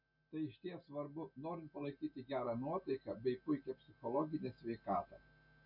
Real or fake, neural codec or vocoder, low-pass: real; none; 5.4 kHz